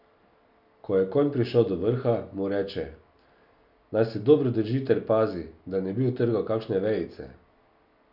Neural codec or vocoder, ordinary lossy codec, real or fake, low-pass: none; none; real; 5.4 kHz